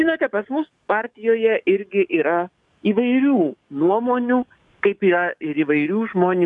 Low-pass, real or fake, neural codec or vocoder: 10.8 kHz; fake; codec, 44.1 kHz, 7.8 kbps, DAC